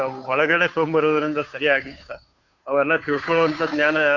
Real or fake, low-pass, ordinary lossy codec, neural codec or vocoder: fake; 7.2 kHz; none; codec, 16 kHz, 2 kbps, FunCodec, trained on Chinese and English, 25 frames a second